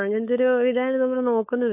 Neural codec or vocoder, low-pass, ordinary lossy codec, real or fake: codec, 16 kHz, 4.8 kbps, FACodec; 3.6 kHz; AAC, 32 kbps; fake